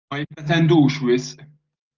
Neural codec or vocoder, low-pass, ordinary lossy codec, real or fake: none; 7.2 kHz; Opus, 24 kbps; real